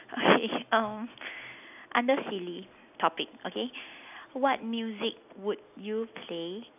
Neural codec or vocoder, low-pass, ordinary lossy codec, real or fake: none; 3.6 kHz; none; real